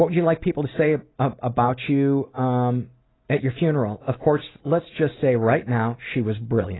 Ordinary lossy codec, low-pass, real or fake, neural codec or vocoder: AAC, 16 kbps; 7.2 kHz; real; none